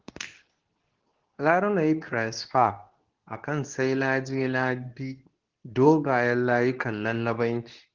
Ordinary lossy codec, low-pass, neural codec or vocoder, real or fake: Opus, 16 kbps; 7.2 kHz; codec, 24 kHz, 0.9 kbps, WavTokenizer, medium speech release version 2; fake